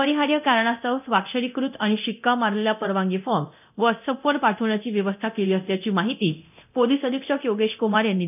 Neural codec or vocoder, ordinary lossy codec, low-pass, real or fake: codec, 24 kHz, 0.9 kbps, DualCodec; none; 3.6 kHz; fake